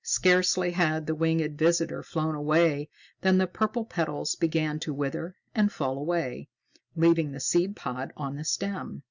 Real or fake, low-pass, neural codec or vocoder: real; 7.2 kHz; none